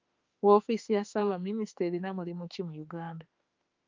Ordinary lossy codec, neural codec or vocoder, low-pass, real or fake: Opus, 24 kbps; autoencoder, 48 kHz, 32 numbers a frame, DAC-VAE, trained on Japanese speech; 7.2 kHz; fake